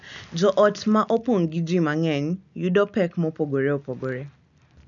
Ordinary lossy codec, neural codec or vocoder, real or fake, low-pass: none; none; real; 7.2 kHz